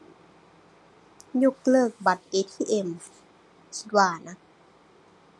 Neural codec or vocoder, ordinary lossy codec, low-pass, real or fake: none; none; none; real